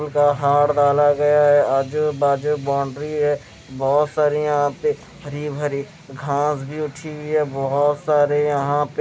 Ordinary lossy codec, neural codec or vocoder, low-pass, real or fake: none; none; none; real